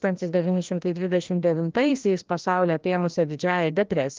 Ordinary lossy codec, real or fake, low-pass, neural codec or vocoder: Opus, 24 kbps; fake; 7.2 kHz; codec, 16 kHz, 1 kbps, FreqCodec, larger model